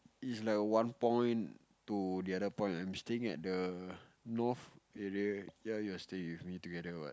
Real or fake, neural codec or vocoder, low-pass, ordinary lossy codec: real; none; none; none